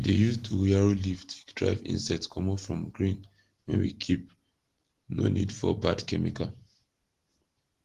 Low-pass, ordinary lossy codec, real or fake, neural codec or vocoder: 14.4 kHz; Opus, 16 kbps; real; none